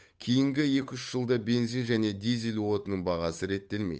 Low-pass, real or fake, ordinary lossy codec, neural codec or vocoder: none; fake; none; codec, 16 kHz, 8 kbps, FunCodec, trained on Chinese and English, 25 frames a second